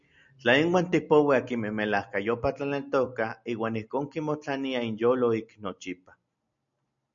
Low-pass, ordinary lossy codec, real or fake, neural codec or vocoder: 7.2 kHz; MP3, 96 kbps; real; none